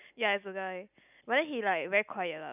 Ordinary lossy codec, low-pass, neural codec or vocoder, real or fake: none; 3.6 kHz; none; real